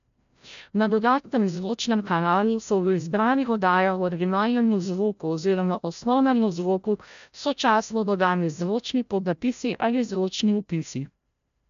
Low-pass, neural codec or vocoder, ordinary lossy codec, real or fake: 7.2 kHz; codec, 16 kHz, 0.5 kbps, FreqCodec, larger model; MP3, 64 kbps; fake